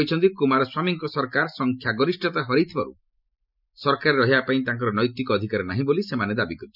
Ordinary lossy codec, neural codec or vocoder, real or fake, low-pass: none; none; real; 5.4 kHz